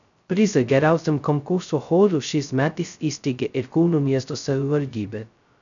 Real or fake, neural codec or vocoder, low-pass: fake; codec, 16 kHz, 0.2 kbps, FocalCodec; 7.2 kHz